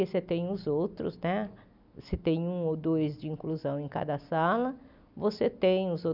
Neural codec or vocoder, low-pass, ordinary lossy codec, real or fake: none; 5.4 kHz; none; real